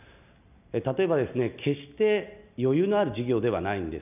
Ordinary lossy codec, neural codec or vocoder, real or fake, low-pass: none; none; real; 3.6 kHz